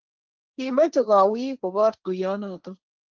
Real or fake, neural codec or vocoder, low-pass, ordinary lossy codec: fake; codec, 16 kHz, 1.1 kbps, Voila-Tokenizer; 7.2 kHz; Opus, 32 kbps